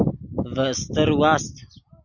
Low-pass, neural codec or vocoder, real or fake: 7.2 kHz; none; real